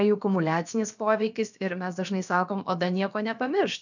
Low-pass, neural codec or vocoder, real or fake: 7.2 kHz; codec, 16 kHz, about 1 kbps, DyCAST, with the encoder's durations; fake